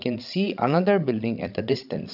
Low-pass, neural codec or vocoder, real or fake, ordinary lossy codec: 5.4 kHz; codec, 16 kHz, 16 kbps, FreqCodec, larger model; fake; none